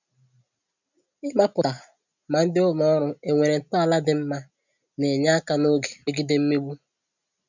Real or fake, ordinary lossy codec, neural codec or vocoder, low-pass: real; none; none; 7.2 kHz